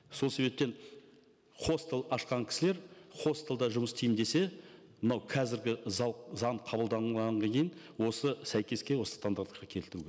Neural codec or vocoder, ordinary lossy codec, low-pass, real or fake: none; none; none; real